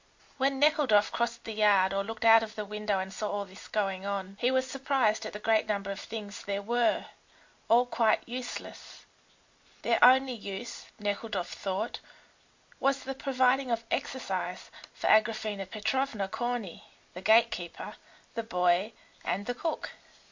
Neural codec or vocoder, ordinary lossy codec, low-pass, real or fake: none; MP3, 48 kbps; 7.2 kHz; real